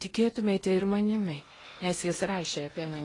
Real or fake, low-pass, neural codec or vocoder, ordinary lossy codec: fake; 10.8 kHz; codec, 16 kHz in and 24 kHz out, 0.8 kbps, FocalCodec, streaming, 65536 codes; AAC, 32 kbps